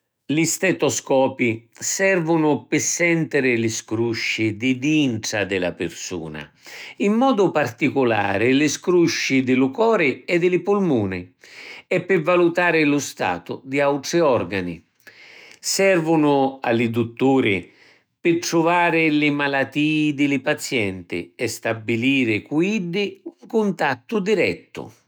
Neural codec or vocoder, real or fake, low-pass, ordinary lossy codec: autoencoder, 48 kHz, 128 numbers a frame, DAC-VAE, trained on Japanese speech; fake; none; none